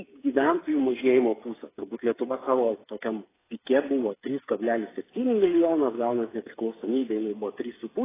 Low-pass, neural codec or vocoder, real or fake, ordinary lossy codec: 3.6 kHz; codec, 16 kHz, 8 kbps, FreqCodec, smaller model; fake; AAC, 16 kbps